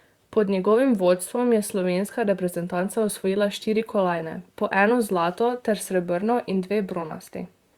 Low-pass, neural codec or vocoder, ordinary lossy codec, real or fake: 19.8 kHz; vocoder, 44.1 kHz, 128 mel bands, Pupu-Vocoder; Opus, 64 kbps; fake